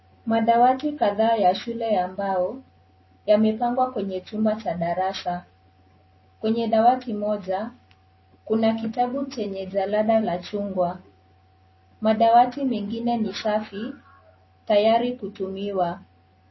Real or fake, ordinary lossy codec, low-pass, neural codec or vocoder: real; MP3, 24 kbps; 7.2 kHz; none